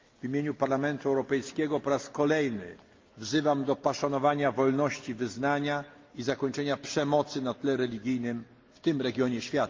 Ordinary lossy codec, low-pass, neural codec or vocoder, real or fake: Opus, 24 kbps; 7.2 kHz; none; real